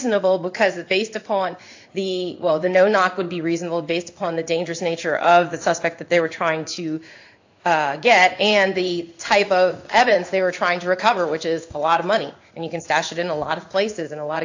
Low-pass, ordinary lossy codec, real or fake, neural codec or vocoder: 7.2 kHz; AAC, 48 kbps; fake; codec, 16 kHz in and 24 kHz out, 1 kbps, XY-Tokenizer